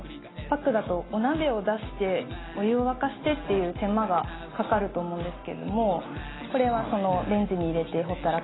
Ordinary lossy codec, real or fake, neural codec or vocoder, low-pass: AAC, 16 kbps; real; none; 7.2 kHz